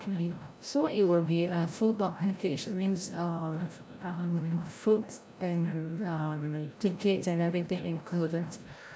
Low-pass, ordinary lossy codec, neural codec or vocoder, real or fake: none; none; codec, 16 kHz, 0.5 kbps, FreqCodec, larger model; fake